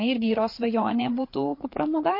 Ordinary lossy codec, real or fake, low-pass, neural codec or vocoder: MP3, 32 kbps; fake; 5.4 kHz; codec, 16 kHz, 4 kbps, FreqCodec, larger model